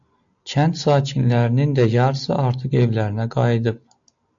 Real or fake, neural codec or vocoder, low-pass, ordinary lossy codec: real; none; 7.2 kHz; AAC, 64 kbps